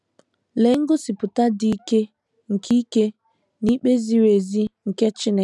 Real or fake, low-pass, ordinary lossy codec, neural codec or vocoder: real; none; none; none